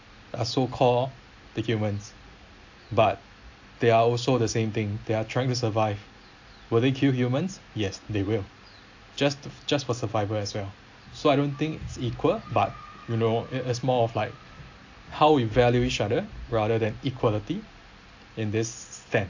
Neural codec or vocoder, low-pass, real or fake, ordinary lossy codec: none; 7.2 kHz; real; AAC, 48 kbps